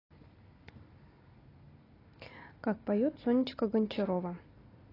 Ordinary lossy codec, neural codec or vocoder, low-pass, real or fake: AAC, 24 kbps; none; 5.4 kHz; real